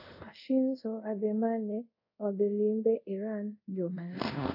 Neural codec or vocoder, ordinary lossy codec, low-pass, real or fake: codec, 24 kHz, 0.5 kbps, DualCodec; AAC, 48 kbps; 5.4 kHz; fake